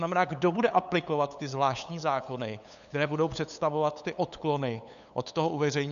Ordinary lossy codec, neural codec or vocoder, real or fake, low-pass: MP3, 96 kbps; codec, 16 kHz, 8 kbps, FunCodec, trained on LibriTTS, 25 frames a second; fake; 7.2 kHz